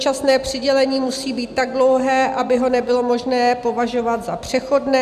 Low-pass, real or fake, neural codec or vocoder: 14.4 kHz; real; none